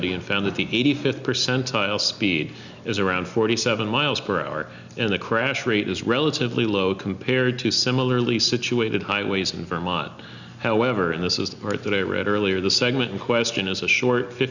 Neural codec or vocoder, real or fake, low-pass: none; real; 7.2 kHz